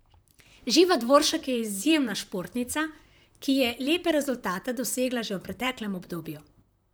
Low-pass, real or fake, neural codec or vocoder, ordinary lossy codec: none; fake; vocoder, 44.1 kHz, 128 mel bands, Pupu-Vocoder; none